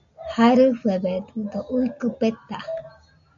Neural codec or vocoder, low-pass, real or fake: none; 7.2 kHz; real